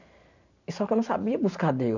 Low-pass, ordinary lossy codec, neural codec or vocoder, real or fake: 7.2 kHz; none; none; real